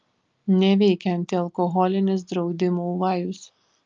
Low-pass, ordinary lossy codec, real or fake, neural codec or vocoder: 7.2 kHz; Opus, 24 kbps; real; none